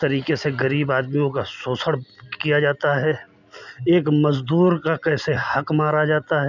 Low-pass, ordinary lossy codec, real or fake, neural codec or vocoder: 7.2 kHz; none; real; none